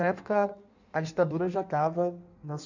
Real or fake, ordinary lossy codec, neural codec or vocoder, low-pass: fake; none; codec, 16 kHz in and 24 kHz out, 1.1 kbps, FireRedTTS-2 codec; 7.2 kHz